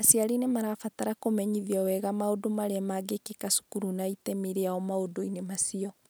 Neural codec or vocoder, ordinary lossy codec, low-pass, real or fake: none; none; none; real